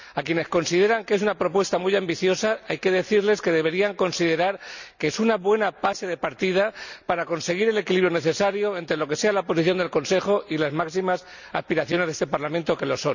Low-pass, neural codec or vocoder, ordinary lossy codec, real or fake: 7.2 kHz; none; none; real